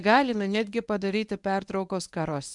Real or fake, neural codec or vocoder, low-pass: fake; codec, 24 kHz, 0.9 kbps, WavTokenizer, medium speech release version 1; 10.8 kHz